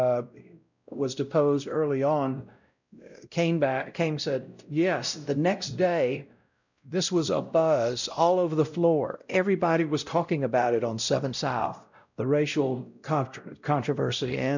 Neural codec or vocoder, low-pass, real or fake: codec, 16 kHz, 0.5 kbps, X-Codec, WavLM features, trained on Multilingual LibriSpeech; 7.2 kHz; fake